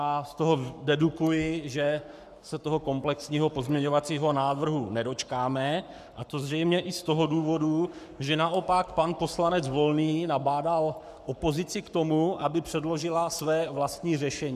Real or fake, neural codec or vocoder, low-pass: fake; codec, 44.1 kHz, 7.8 kbps, Pupu-Codec; 14.4 kHz